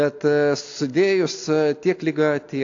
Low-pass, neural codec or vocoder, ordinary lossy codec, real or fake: 7.2 kHz; none; AAC, 48 kbps; real